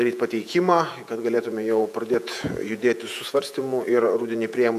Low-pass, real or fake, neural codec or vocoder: 14.4 kHz; fake; vocoder, 48 kHz, 128 mel bands, Vocos